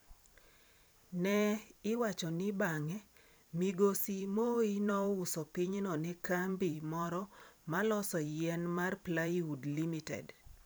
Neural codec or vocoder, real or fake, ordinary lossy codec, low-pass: vocoder, 44.1 kHz, 128 mel bands every 512 samples, BigVGAN v2; fake; none; none